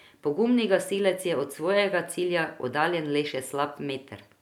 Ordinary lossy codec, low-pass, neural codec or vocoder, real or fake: none; 19.8 kHz; none; real